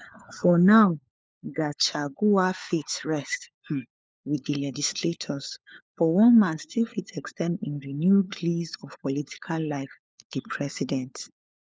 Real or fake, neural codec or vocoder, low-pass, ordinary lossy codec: fake; codec, 16 kHz, 4 kbps, FunCodec, trained on LibriTTS, 50 frames a second; none; none